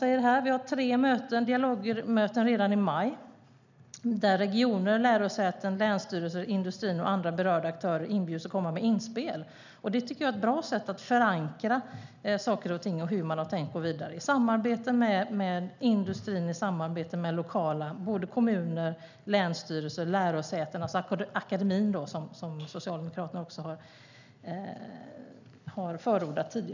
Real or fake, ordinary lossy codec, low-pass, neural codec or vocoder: real; none; 7.2 kHz; none